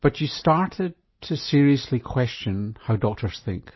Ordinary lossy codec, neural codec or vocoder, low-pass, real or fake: MP3, 24 kbps; none; 7.2 kHz; real